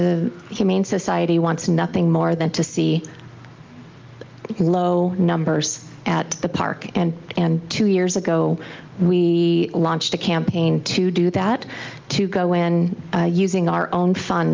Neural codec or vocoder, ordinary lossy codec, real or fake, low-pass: none; Opus, 24 kbps; real; 7.2 kHz